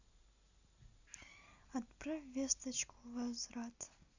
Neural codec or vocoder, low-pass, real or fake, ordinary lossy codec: none; 7.2 kHz; real; Opus, 64 kbps